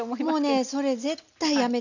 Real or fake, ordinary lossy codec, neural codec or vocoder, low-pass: real; none; none; 7.2 kHz